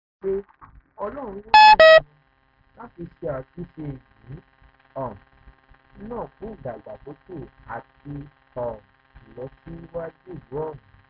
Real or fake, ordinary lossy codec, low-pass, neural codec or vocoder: real; none; 5.4 kHz; none